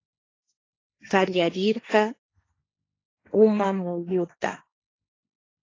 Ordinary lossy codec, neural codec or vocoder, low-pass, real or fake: AAC, 32 kbps; codec, 16 kHz, 1.1 kbps, Voila-Tokenizer; 7.2 kHz; fake